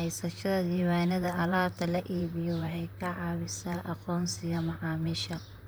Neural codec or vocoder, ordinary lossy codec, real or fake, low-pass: vocoder, 44.1 kHz, 128 mel bands, Pupu-Vocoder; none; fake; none